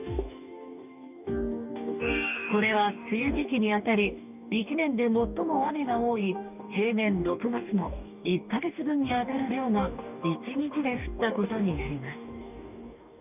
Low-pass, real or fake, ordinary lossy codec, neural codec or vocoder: 3.6 kHz; fake; none; codec, 44.1 kHz, 2.6 kbps, DAC